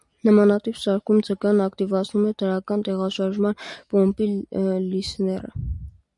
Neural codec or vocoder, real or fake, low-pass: none; real; 10.8 kHz